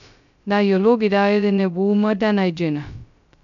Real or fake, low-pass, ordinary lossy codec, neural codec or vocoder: fake; 7.2 kHz; none; codec, 16 kHz, 0.2 kbps, FocalCodec